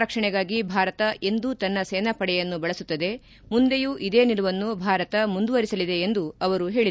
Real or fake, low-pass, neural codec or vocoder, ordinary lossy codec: real; none; none; none